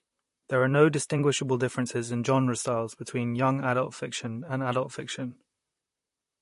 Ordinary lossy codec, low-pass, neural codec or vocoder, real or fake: MP3, 48 kbps; 14.4 kHz; vocoder, 44.1 kHz, 128 mel bands, Pupu-Vocoder; fake